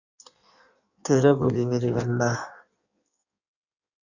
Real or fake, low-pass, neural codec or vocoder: fake; 7.2 kHz; codec, 16 kHz in and 24 kHz out, 1.1 kbps, FireRedTTS-2 codec